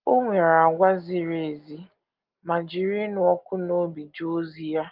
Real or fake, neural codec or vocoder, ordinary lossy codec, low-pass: real; none; Opus, 32 kbps; 5.4 kHz